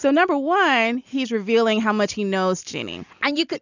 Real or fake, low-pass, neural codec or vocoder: real; 7.2 kHz; none